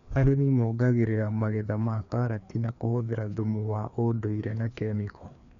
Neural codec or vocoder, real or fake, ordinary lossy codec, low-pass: codec, 16 kHz, 2 kbps, FreqCodec, larger model; fake; none; 7.2 kHz